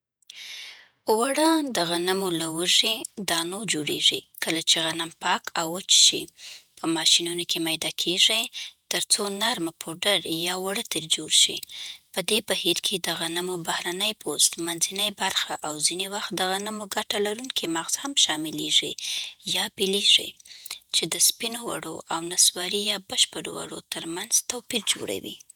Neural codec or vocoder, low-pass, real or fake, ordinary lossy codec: vocoder, 48 kHz, 128 mel bands, Vocos; none; fake; none